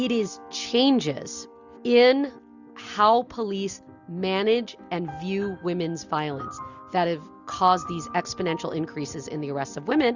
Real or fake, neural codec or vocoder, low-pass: real; none; 7.2 kHz